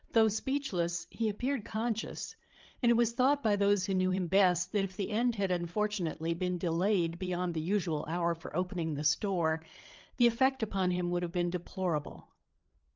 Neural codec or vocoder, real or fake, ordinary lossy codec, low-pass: codec, 16 kHz, 8 kbps, FreqCodec, larger model; fake; Opus, 32 kbps; 7.2 kHz